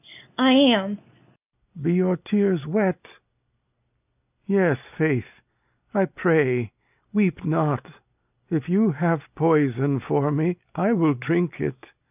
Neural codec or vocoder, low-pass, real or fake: none; 3.6 kHz; real